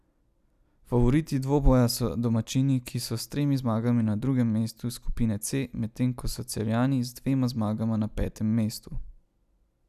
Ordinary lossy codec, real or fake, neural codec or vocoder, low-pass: none; real; none; 14.4 kHz